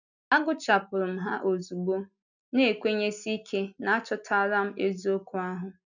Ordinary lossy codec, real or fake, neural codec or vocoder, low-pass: none; real; none; 7.2 kHz